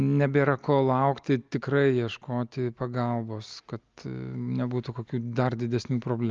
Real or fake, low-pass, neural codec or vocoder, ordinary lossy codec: real; 7.2 kHz; none; Opus, 32 kbps